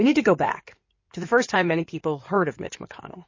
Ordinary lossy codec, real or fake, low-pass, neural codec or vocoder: MP3, 32 kbps; fake; 7.2 kHz; codec, 16 kHz in and 24 kHz out, 2.2 kbps, FireRedTTS-2 codec